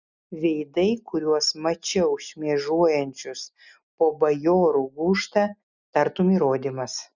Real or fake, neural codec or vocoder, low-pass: real; none; 7.2 kHz